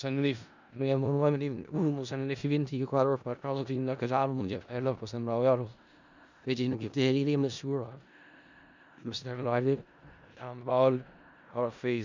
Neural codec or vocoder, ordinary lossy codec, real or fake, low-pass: codec, 16 kHz in and 24 kHz out, 0.4 kbps, LongCat-Audio-Codec, four codebook decoder; none; fake; 7.2 kHz